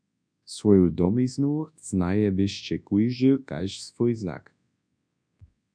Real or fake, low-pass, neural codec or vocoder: fake; 9.9 kHz; codec, 24 kHz, 0.9 kbps, WavTokenizer, large speech release